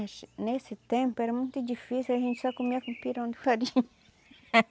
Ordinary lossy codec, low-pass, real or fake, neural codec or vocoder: none; none; real; none